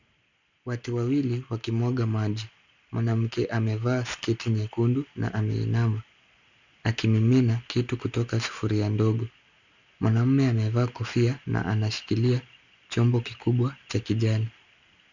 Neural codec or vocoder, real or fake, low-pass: none; real; 7.2 kHz